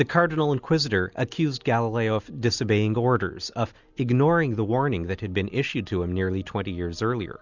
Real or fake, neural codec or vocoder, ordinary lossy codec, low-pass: real; none; Opus, 64 kbps; 7.2 kHz